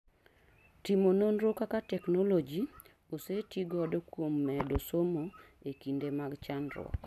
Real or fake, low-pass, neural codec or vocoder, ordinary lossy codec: real; 14.4 kHz; none; none